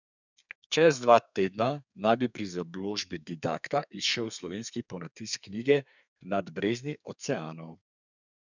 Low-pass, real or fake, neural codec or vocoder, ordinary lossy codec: 7.2 kHz; fake; codec, 32 kHz, 1.9 kbps, SNAC; none